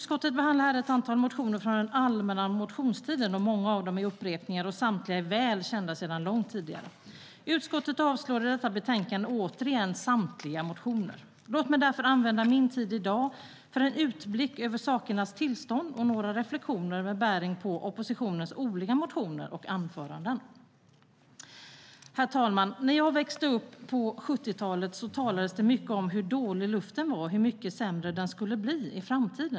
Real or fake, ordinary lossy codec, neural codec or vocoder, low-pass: real; none; none; none